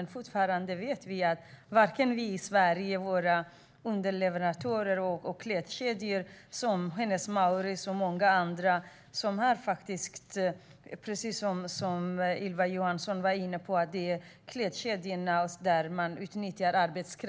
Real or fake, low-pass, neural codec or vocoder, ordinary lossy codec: real; none; none; none